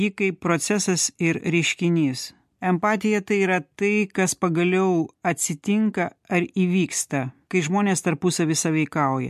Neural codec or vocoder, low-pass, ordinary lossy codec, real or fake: none; 14.4 kHz; MP3, 64 kbps; real